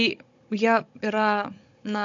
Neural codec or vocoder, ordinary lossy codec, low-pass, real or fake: codec, 16 kHz, 16 kbps, FunCodec, trained on Chinese and English, 50 frames a second; MP3, 48 kbps; 7.2 kHz; fake